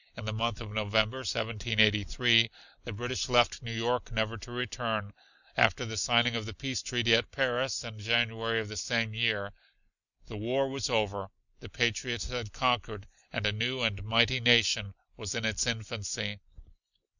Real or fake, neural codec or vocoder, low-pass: real; none; 7.2 kHz